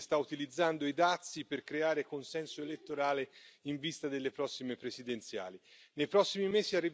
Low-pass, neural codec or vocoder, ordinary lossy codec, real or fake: none; none; none; real